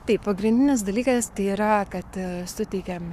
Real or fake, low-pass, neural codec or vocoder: fake; 14.4 kHz; codec, 44.1 kHz, 7.8 kbps, Pupu-Codec